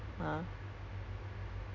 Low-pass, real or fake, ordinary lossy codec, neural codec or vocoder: 7.2 kHz; real; none; none